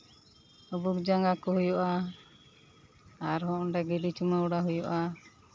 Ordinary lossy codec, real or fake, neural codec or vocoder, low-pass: none; real; none; none